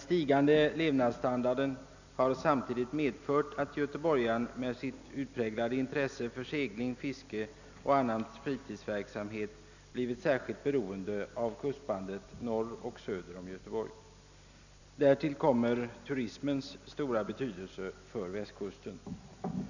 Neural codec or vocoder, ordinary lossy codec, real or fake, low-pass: none; none; real; 7.2 kHz